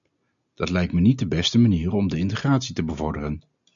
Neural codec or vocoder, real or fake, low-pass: none; real; 7.2 kHz